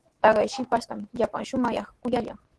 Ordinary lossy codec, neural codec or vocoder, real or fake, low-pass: Opus, 16 kbps; autoencoder, 48 kHz, 128 numbers a frame, DAC-VAE, trained on Japanese speech; fake; 10.8 kHz